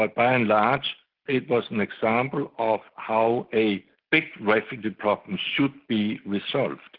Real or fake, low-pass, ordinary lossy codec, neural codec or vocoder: real; 5.4 kHz; Opus, 16 kbps; none